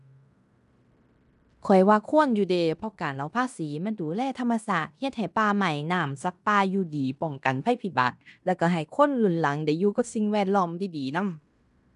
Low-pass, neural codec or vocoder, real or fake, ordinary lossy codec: 10.8 kHz; codec, 16 kHz in and 24 kHz out, 0.9 kbps, LongCat-Audio-Codec, fine tuned four codebook decoder; fake; none